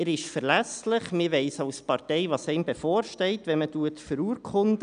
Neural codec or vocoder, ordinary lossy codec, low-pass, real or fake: none; none; 9.9 kHz; real